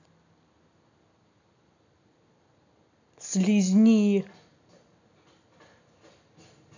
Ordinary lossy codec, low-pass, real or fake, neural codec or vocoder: none; 7.2 kHz; real; none